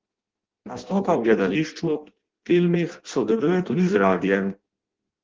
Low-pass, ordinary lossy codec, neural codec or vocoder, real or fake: 7.2 kHz; Opus, 16 kbps; codec, 16 kHz in and 24 kHz out, 0.6 kbps, FireRedTTS-2 codec; fake